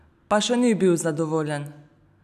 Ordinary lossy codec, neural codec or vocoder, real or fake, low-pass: none; none; real; 14.4 kHz